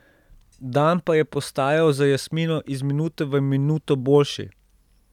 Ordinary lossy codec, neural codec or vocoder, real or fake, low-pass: none; none; real; 19.8 kHz